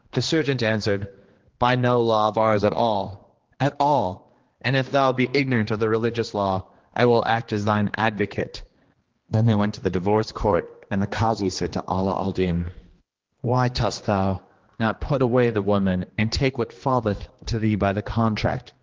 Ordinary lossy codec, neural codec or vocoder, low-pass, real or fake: Opus, 16 kbps; codec, 16 kHz, 2 kbps, X-Codec, HuBERT features, trained on general audio; 7.2 kHz; fake